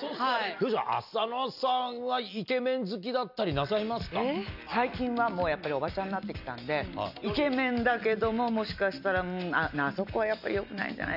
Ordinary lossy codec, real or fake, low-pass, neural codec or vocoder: none; real; 5.4 kHz; none